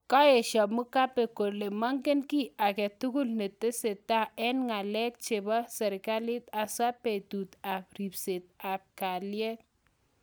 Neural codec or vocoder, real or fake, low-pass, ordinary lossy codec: none; real; none; none